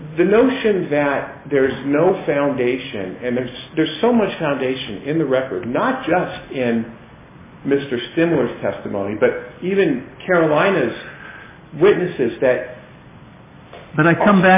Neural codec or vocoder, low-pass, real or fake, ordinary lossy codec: none; 3.6 kHz; real; MP3, 16 kbps